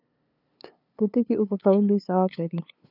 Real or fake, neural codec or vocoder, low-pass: fake; codec, 16 kHz, 2 kbps, FunCodec, trained on LibriTTS, 25 frames a second; 5.4 kHz